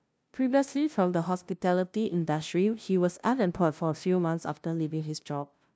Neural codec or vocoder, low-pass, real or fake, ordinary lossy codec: codec, 16 kHz, 0.5 kbps, FunCodec, trained on LibriTTS, 25 frames a second; none; fake; none